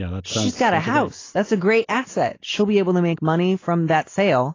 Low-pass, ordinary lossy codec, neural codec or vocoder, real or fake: 7.2 kHz; AAC, 32 kbps; none; real